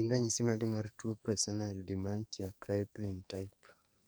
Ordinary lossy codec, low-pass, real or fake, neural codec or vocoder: none; none; fake; codec, 44.1 kHz, 2.6 kbps, SNAC